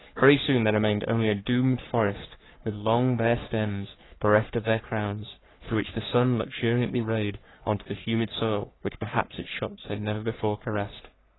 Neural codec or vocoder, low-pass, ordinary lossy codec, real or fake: codec, 44.1 kHz, 3.4 kbps, Pupu-Codec; 7.2 kHz; AAC, 16 kbps; fake